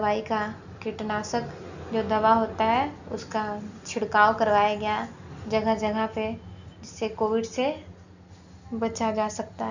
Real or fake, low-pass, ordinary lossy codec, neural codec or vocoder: real; 7.2 kHz; none; none